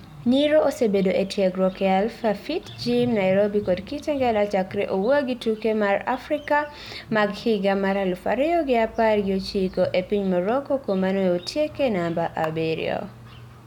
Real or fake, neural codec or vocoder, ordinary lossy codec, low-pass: real; none; none; 19.8 kHz